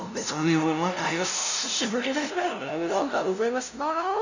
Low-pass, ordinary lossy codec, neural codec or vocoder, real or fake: 7.2 kHz; none; codec, 16 kHz, 0.5 kbps, FunCodec, trained on LibriTTS, 25 frames a second; fake